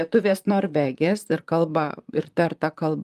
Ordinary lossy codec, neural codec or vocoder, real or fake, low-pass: Opus, 32 kbps; vocoder, 44.1 kHz, 128 mel bands, Pupu-Vocoder; fake; 14.4 kHz